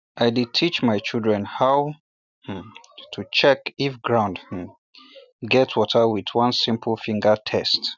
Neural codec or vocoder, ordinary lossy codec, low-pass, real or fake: none; none; 7.2 kHz; real